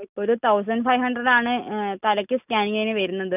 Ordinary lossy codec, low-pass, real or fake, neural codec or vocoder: none; 3.6 kHz; real; none